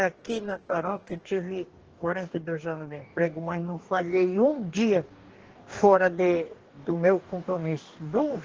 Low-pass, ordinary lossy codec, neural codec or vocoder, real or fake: 7.2 kHz; Opus, 16 kbps; codec, 44.1 kHz, 2.6 kbps, DAC; fake